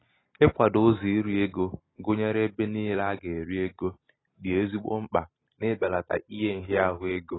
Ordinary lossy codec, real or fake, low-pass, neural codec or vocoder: AAC, 16 kbps; real; 7.2 kHz; none